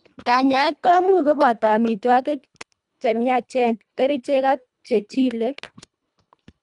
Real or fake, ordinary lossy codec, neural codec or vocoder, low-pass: fake; none; codec, 24 kHz, 1.5 kbps, HILCodec; 10.8 kHz